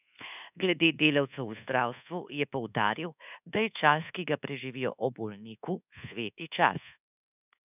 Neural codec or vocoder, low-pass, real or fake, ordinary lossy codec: codec, 24 kHz, 1.2 kbps, DualCodec; 3.6 kHz; fake; none